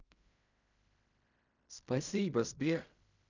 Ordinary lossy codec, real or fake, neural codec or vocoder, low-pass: none; fake; codec, 16 kHz in and 24 kHz out, 0.4 kbps, LongCat-Audio-Codec, fine tuned four codebook decoder; 7.2 kHz